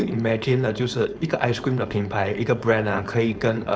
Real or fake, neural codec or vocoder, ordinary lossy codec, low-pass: fake; codec, 16 kHz, 4.8 kbps, FACodec; none; none